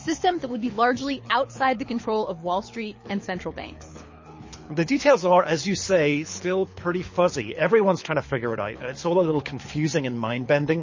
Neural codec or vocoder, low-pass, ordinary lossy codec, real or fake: codec, 24 kHz, 6 kbps, HILCodec; 7.2 kHz; MP3, 32 kbps; fake